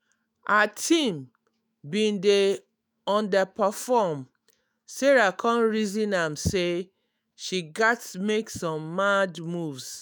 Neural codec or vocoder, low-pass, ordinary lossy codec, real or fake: autoencoder, 48 kHz, 128 numbers a frame, DAC-VAE, trained on Japanese speech; none; none; fake